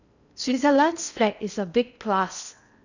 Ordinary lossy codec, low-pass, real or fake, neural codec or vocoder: none; 7.2 kHz; fake; codec, 16 kHz in and 24 kHz out, 0.6 kbps, FocalCodec, streaming, 4096 codes